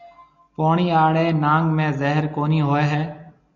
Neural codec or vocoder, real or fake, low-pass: none; real; 7.2 kHz